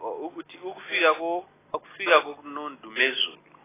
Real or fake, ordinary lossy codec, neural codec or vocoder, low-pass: real; AAC, 16 kbps; none; 3.6 kHz